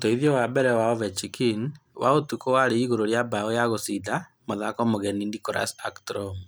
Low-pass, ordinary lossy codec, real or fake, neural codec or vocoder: none; none; real; none